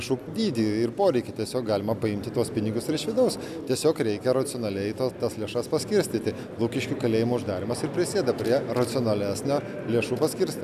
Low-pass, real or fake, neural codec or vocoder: 14.4 kHz; real; none